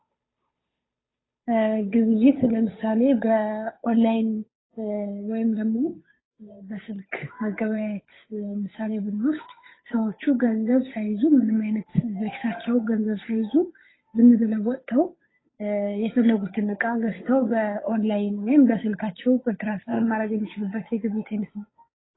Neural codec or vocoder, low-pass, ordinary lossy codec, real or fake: codec, 16 kHz, 8 kbps, FunCodec, trained on Chinese and English, 25 frames a second; 7.2 kHz; AAC, 16 kbps; fake